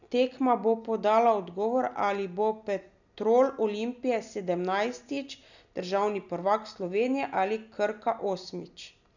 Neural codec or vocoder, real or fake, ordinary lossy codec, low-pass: none; real; none; 7.2 kHz